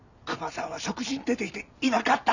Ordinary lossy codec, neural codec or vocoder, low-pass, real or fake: none; none; 7.2 kHz; real